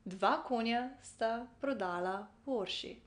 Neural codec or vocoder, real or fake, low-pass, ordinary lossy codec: none; real; 9.9 kHz; none